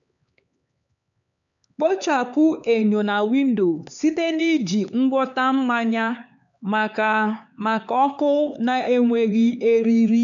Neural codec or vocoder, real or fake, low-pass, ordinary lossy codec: codec, 16 kHz, 4 kbps, X-Codec, HuBERT features, trained on LibriSpeech; fake; 7.2 kHz; none